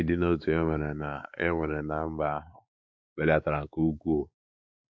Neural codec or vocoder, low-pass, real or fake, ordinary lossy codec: codec, 16 kHz, 4 kbps, X-Codec, WavLM features, trained on Multilingual LibriSpeech; none; fake; none